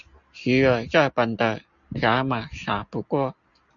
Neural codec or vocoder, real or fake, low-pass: none; real; 7.2 kHz